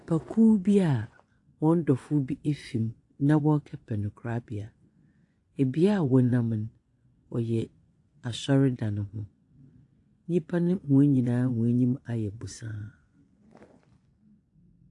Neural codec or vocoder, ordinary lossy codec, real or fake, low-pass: vocoder, 24 kHz, 100 mel bands, Vocos; AAC, 48 kbps; fake; 10.8 kHz